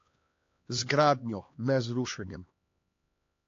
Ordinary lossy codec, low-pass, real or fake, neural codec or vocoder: AAC, 48 kbps; 7.2 kHz; fake; codec, 16 kHz, 2 kbps, X-Codec, HuBERT features, trained on LibriSpeech